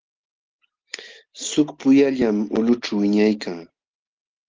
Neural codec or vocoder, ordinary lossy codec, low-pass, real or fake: none; Opus, 16 kbps; 7.2 kHz; real